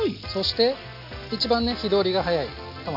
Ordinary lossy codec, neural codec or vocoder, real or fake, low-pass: none; none; real; 5.4 kHz